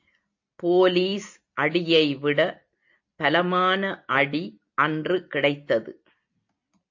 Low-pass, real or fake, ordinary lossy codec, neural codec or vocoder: 7.2 kHz; real; AAC, 48 kbps; none